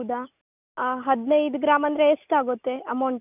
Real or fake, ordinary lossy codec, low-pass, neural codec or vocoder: real; none; 3.6 kHz; none